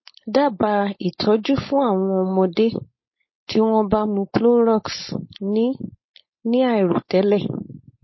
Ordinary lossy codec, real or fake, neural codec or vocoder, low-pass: MP3, 24 kbps; fake; codec, 16 kHz, 4.8 kbps, FACodec; 7.2 kHz